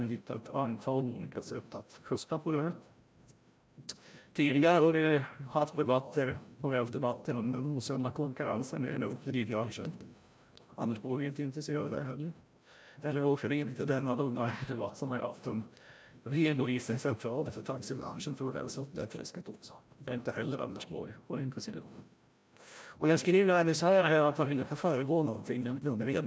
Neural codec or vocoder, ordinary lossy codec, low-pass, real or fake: codec, 16 kHz, 0.5 kbps, FreqCodec, larger model; none; none; fake